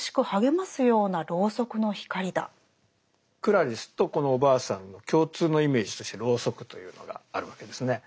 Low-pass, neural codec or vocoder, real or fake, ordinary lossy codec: none; none; real; none